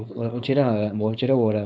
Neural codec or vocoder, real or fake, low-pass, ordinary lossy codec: codec, 16 kHz, 4.8 kbps, FACodec; fake; none; none